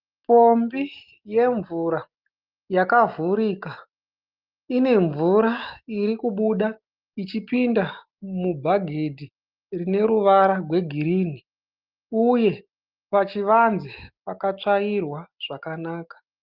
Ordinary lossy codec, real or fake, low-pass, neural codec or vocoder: Opus, 24 kbps; real; 5.4 kHz; none